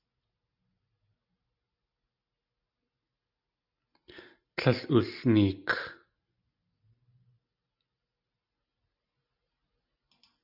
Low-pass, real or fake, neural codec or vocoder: 5.4 kHz; real; none